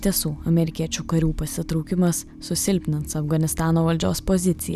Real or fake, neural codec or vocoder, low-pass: real; none; 14.4 kHz